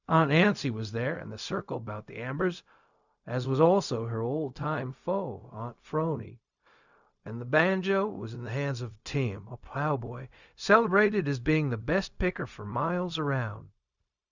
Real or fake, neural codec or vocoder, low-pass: fake; codec, 16 kHz, 0.4 kbps, LongCat-Audio-Codec; 7.2 kHz